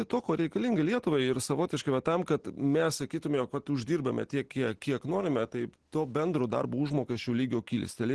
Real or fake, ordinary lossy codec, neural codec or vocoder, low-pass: real; Opus, 16 kbps; none; 10.8 kHz